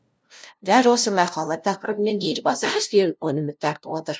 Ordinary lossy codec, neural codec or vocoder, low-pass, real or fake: none; codec, 16 kHz, 0.5 kbps, FunCodec, trained on LibriTTS, 25 frames a second; none; fake